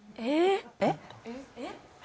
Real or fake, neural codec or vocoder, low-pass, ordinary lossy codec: real; none; none; none